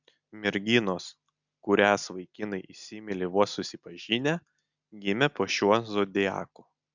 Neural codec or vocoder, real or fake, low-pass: none; real; 7.2 kHz